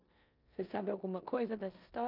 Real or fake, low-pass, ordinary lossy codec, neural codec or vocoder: fake; 5.4 kHz; none; codec, 16 kHz in and 24 kHz out, 0.9 kbps, LongCat-Audio-Codec, four codebook decoder